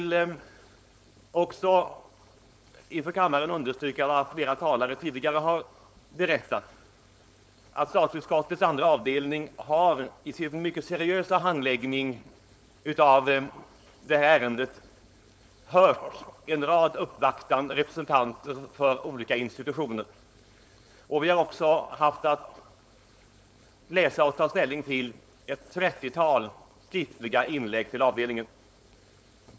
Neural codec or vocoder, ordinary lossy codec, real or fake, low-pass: codec, 16 kHz, 4.8 kbps, FACodec; none; fake; none